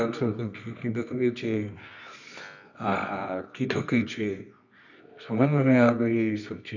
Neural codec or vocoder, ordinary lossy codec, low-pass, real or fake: codec, 24 kHz, 0.9 kbps, WavTokenizer, medium music audio release; none; 7.2 kHz; fake